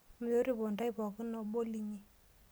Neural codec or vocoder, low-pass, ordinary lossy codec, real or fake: none; none; none; real